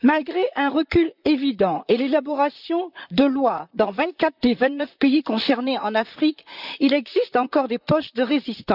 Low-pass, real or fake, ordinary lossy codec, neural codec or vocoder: 5.4 kHz; fake; none; vocoder, 44.1 kHz, 128 mel bands, Pupu-Vocoder